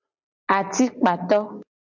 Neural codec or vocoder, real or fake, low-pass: none; real; 7.2 kHz